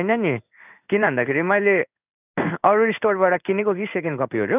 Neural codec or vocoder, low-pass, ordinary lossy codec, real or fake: codec, 16 kHz in and 24 kHz out, 1 kbps, XY-Tokenizer; 3.6 kHz; none; fake